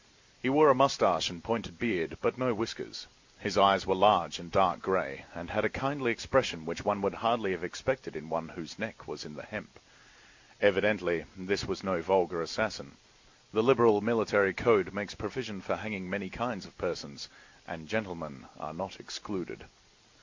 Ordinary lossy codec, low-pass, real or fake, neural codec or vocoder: MP3, 64 kbps; 7.2 kHz; real; none